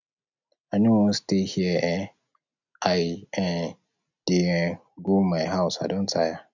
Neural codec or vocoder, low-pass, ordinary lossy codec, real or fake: none; 7.2 kHz; none; real